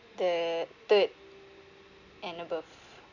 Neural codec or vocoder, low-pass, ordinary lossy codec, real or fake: none; 7.2 kHz; none; real